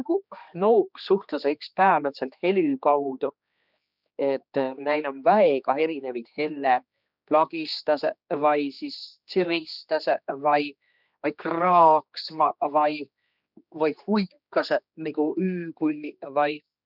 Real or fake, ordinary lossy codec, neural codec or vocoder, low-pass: fake; none; codec, 16 kHz, 2 kbps, X-Codec, HuBERT features, trained on general audio; 5.4 kHz